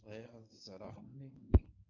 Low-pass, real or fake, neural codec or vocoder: 7.2 kHz; fake; codec, 24 kHz, 0.9 kbps, WavTokenizer, medium speech release version 1